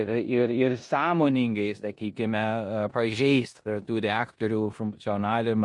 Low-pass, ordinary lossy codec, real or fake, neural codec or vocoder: 10.8 kHz; MP3, 48 kbps; fake; codec, 16 kHz in and 24 kHz out, 0.9 kbps, LongCat-Audio-Codec, four codebook decoder